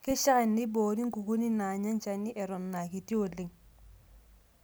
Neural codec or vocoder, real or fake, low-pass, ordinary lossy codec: none; real; none; none